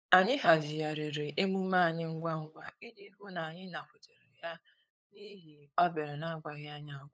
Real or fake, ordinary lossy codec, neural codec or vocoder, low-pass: fake; none; codec, 16 kHz, 8 kbps, FunCodec, trained on LibriTTS, 25 frames a second; none